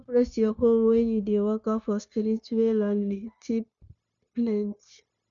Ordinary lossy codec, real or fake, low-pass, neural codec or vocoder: none; fake; 7.2 kHz; codec, 16 kHz, 0.9 kbps, LongCat-Audio-Codec